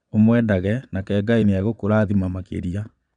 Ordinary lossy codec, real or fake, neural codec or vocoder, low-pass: none; fake; vocoder, 22.05 kHz, 80 mel bands, Vocos; 9.9 kHz